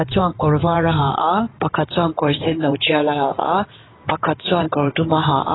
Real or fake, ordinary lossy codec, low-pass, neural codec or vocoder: fake; AAC, 16 kbps; 7.2 kHz; codec, 16 kHz in and 24 kHz out, 2.2 kbps, FireRedTTS-2 codec